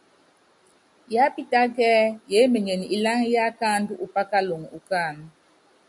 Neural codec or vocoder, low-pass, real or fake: none; 10.8 kHz; real